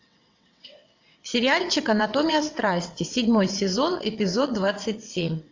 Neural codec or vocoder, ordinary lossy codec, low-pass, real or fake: vocoder, 22.05 kHz, 80 mel bands, WaveNeXt; AAC, 48 kbps; 7.2 kHz; fake